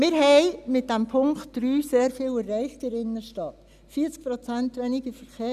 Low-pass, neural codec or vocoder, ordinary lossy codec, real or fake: 14.4 kHz; none; none; real